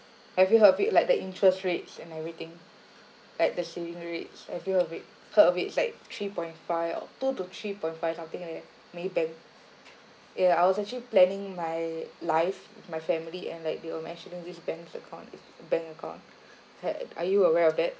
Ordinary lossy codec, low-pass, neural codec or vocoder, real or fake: none; none; none; real